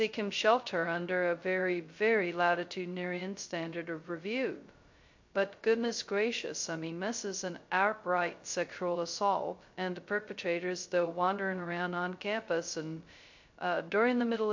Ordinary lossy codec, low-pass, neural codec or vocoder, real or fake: MP3, 48 kbps; 7.2 kHz; codec, 16 kHz, 0.2 kbps, FocalCodec; fake